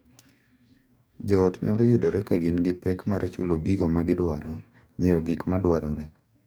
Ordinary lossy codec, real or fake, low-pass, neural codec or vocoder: none; fake; none; codec, 44.1 kHz, 2.6 kbps, DAC